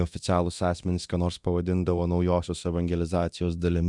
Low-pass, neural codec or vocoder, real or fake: 10.8 kHz; codec, 24 kHz, 0.9 kbps, DualCodec; fake